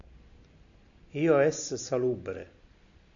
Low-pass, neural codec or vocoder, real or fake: 7.2 kHz; none; real